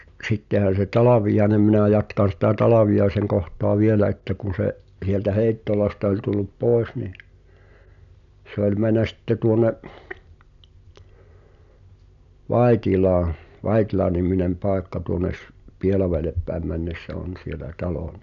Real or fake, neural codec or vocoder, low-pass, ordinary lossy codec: real; none; 7.2 kHz; none